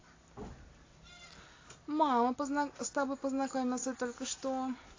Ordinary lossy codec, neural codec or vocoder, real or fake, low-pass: AAC, 32 kbps; none; real; 7.2 kHz